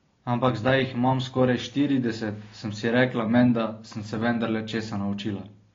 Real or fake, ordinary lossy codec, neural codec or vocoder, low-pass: real; AAC, 32 kbps; none; 7.2 kHz